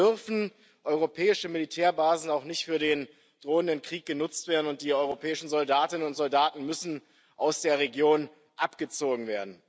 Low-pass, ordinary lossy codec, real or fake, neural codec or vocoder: none; none; real; none